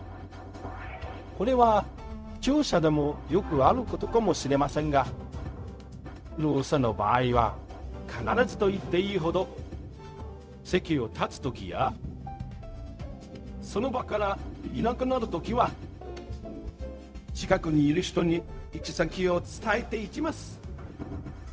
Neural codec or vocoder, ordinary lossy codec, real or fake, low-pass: codec, 16 kHz, 0.4 kbps, LongCat-Audio-Codec; none; fake; none